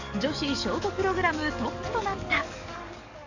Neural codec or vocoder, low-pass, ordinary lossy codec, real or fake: codec, 44.1 kHz, 7.8 kbps, DAC; 7.2 kHz; none; fake